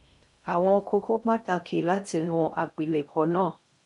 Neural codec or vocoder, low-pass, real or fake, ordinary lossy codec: codec, 16 kHz in and 24 kHz out, 0.6 kbps, FocalCodec, streaming, 2048 codes; 10.8 kHz; fake; MP3, 96 kbps